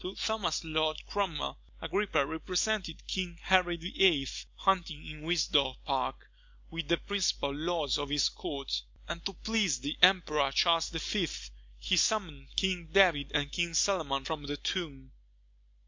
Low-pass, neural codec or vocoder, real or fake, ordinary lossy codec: 7.2 kHz; none; real; MP3, 64 kbps